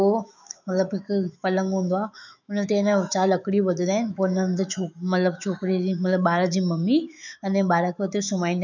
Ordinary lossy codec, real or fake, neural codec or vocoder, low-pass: none; fake; autoencoder, 48 kHz, 128 numbers a frame, DAC-VAE, trained on Japanese speech; 7.2 kHz